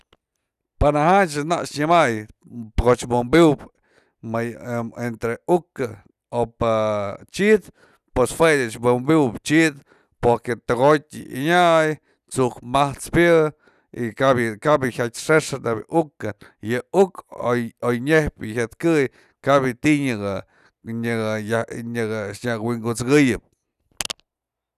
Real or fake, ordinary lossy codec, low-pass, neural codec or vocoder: real; none; 14.4 kHz; none